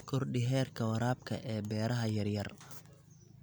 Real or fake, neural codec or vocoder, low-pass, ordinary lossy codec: real; none; none; none